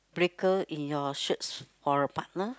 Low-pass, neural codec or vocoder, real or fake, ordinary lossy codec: none; none; real; none